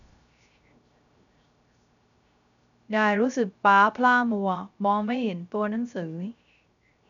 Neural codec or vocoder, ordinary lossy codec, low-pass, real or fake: codec, 16 kHz, 0.3 kbps, FocalCodec; none; 7.2 kHz; fake